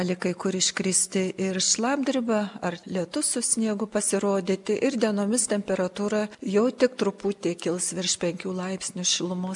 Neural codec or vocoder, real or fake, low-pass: none; real; 10.8 kHz